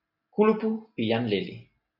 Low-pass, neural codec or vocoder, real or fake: 5.4 kHz; none; real